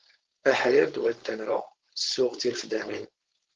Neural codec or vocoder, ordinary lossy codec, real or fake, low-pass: codec, 16 kHz, 4.8 kbps, FACodec; Opus, 16 kbps; fake; 7.2 kHz